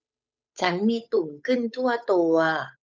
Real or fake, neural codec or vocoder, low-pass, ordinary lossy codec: fake; codec, 16 kHz, 8 kbps, FunCodec, trained on Chinese and English, 25 frames a second; none; none